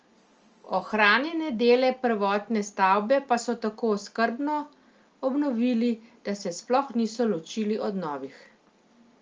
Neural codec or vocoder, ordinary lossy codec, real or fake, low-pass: none; Opus, 24 kbps; real; 7.2 kHz